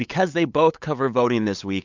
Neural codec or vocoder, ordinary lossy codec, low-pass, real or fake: codec, 16 kHz, 8 kbps, FunCodec, trained on LibriTTS, 25 frames a second; MP3, 64 kbps; 7.2 kHz; fake